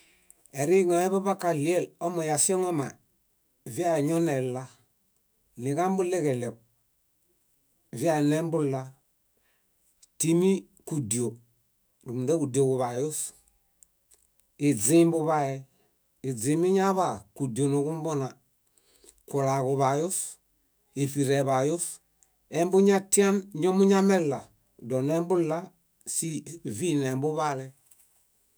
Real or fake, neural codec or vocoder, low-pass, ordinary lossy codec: fake; autoencoder, 48 kHz, 128 numbers a frame, DAC-VAE, trained on Japanese speech; none; none